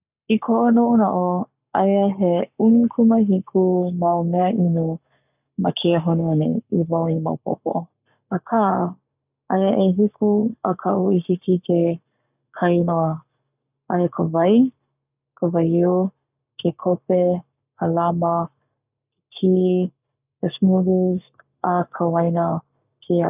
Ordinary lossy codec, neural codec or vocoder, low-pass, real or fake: none; codec, 44.1 kHz, 7.8 kbps, Pupu-Codec; 3.6 kHz; fake